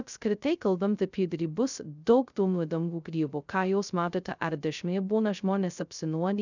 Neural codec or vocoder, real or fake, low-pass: codec, 16 kHz, 0.2 kbps, FocalCodec; fake; 7.2 kHz